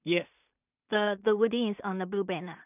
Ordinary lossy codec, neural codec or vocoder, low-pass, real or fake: none; codec, 16 kHz in and 24 kHz out, 0.4 kbps, LongCat-Audio-Codec, two codebook decoder; 3.6 kHz; fake